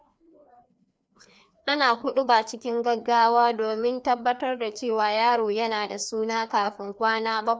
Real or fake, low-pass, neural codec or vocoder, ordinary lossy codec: fake; none; codec, 16 kHz, 2 kbps, FreqCodec, larger model; none